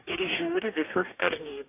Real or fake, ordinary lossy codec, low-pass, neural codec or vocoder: fake; AAC, 32 kbps; 3.6 kHz; codec, 44.1 kHz, 2.6 kbps, DAC